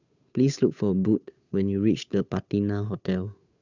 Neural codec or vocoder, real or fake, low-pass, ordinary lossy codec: codec, 16 kHz, 8 kbps, FunCodec, trained on Chinese and English, 25 frames a second; fake; 7.2 kHz; none